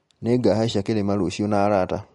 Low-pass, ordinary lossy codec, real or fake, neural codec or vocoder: 19.8 kHz; MP3, 48 kbps; real; none